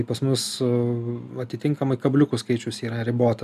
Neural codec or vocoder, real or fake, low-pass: none; real; 14.4 kHz